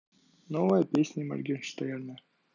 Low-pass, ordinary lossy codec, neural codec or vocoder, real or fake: 7.2 kHz; none; none; real